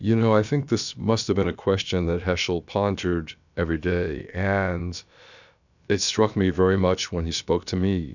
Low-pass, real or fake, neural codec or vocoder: 7.2 kHz; fake; codec, 16 kHz, about 1 kbps, DyCAST, with the encoder's durations